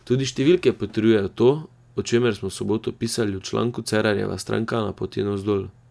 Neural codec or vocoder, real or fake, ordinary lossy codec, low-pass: none; real; none; none